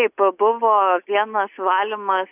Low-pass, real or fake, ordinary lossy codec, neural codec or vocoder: 3.6 kHz; real; AAC, 32 kbps; none